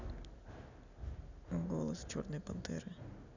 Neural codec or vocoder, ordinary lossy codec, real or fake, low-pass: none; none; real; 7.2 kHz